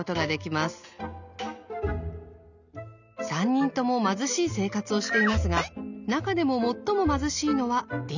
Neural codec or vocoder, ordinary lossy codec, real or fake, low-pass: none; none; real; 7.2 kHz